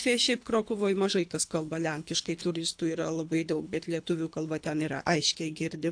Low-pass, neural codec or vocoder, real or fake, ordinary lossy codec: 9.9 kHz; codec, 24 kHz, 3 kbps, HILCodec; fake; AAC, 64 kbps